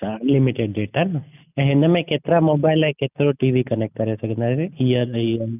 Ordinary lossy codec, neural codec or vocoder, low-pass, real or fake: none; none; 3.6 kHz; real